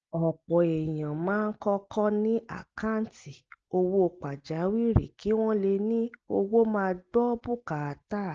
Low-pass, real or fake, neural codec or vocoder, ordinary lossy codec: 7.2 kHz; real; none; Opus, 16 kbps